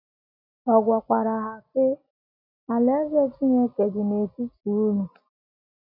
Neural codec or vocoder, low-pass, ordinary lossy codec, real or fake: none; 5.4 kHz; none; real